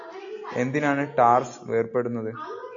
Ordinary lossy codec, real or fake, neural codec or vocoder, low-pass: AAC, 48 kbps; real; none; 7.2 kHz